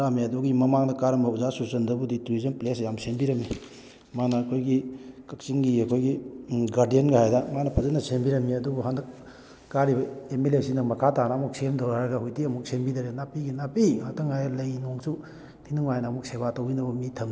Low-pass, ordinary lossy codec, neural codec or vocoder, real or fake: none; none; none; real